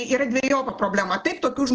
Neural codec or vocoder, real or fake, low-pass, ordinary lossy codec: none; real; 7.2 kHz; Opus, 16 kbps